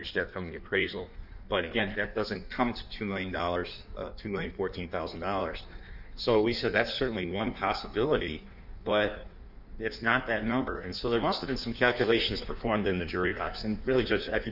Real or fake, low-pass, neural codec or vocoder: fake; 5.4 kHz; codec, 16 kHz in and 24 kHz out, 1.1 kbps, FireRedTTS-2 codec